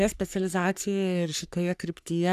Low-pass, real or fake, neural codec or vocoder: 14.4 kHz; fake; codec, 44.1 kHz, 3.4 kbps, Pupu-Codec